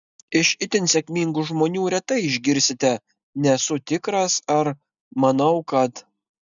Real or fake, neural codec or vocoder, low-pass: real; none; 7.2 kHz